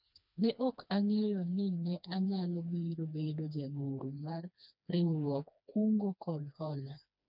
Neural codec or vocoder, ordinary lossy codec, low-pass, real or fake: codec, 16 kHz, 2 kbps, FreqCodec, smaller model; none; 5.4 kHz; fake